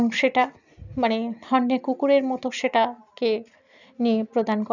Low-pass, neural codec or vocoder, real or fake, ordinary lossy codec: 7.2 kHz; none; real; none